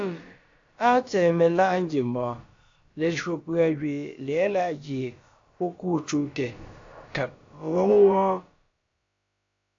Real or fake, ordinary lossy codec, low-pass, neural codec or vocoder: fake; AAC, 48 kbps; 7.2 kHz; codec, 16 kHz, about 1 kbps, DyCAST, with the encoder's durations